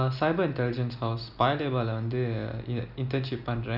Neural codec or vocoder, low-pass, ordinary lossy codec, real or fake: none; 5.4 kHz; none; real